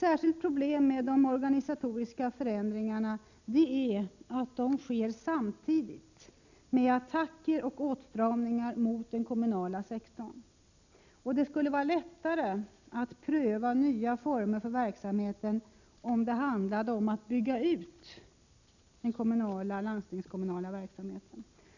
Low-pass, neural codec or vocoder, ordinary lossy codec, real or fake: 7.2 kHz; none; none; real